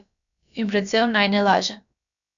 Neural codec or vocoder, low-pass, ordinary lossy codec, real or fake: codec, 16 kHz, about 1 kbps, DyCAST, with the encoder's durations; 7.2 kHz; none; fake